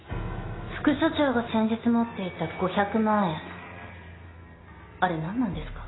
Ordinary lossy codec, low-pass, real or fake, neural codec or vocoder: AAC, 16 kbps; 7.2 kHz; real; none